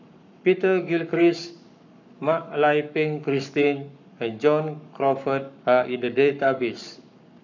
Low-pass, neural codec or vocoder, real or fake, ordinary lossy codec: 7.2 kHz; codec, 44.1 kHz, 7.8 kbps, Pupu-Codec; fake; none